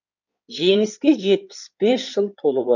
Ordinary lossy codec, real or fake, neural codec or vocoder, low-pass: none; fake; codec, 16 kHz in and 24 kHz out, 2.2 kbps, FireRedTTS-2 codec; 7.2 kHz